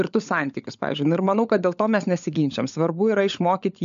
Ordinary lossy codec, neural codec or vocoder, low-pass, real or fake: MP3, 64 kbps; codec, 16 kHz, 16 kbps, FunCodec, trained on LibriTTS, 50 frames a second; 7.2 kHz; fake